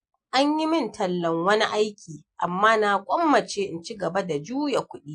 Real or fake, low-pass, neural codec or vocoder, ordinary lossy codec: real; 9.9 kHz; none; AAC, 48 kbps